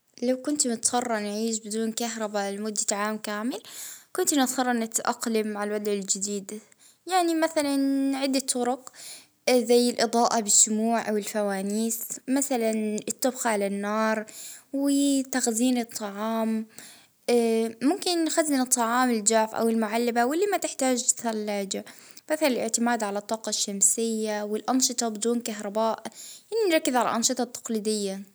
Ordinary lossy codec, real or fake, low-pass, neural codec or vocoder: none; real; none; none